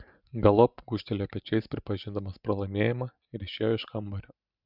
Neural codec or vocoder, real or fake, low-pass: none; real; 5.4 kHz